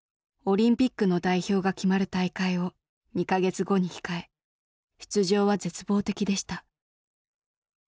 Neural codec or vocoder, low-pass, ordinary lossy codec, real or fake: none; none; none; real